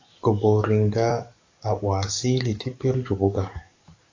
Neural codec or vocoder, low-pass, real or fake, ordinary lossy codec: codec, 44.1 kHz, 7.8 kbps, DAC; 7.2 kHz; fake; AAC, 48 kbps